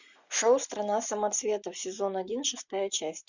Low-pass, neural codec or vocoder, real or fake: 7.2 kHz; none; real